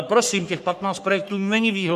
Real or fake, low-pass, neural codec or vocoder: fake; 14.4 kHz; codec, 44.1 kHz, 3.4 kbps, Pupu-Codec